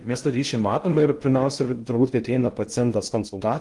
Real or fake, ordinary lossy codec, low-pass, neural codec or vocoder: fake; Opus, 24 kbps; 10.8 kHz; codec, 16 kHz in and 24 kHz out, 0.6 kbps, FocalCodec, streaming, 2048 codes